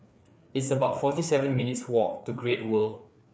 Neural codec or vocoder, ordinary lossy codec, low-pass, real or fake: codec, 16 kHz, 4 kbps, FreqCodec, larger model; none; none; fake